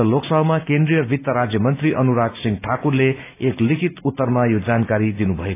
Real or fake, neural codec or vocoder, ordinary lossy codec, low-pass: real; none; AAC, 24 kbps; 3.6 kHz